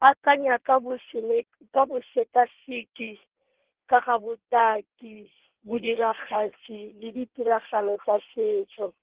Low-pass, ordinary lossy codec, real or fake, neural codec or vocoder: 3.6 kHz; Opus, 16 kbps; fake; codec, 16 kHz in and 24 kHz out, 1.1 kbps, FireRedTTS-2 codec